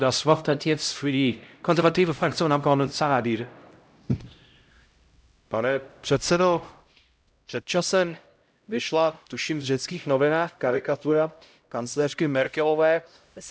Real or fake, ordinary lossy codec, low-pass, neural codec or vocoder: fake; none; none; codec, 16 kHz, 0.5 kbps, X-Codec, HuBERT features, trained on LibriSpeech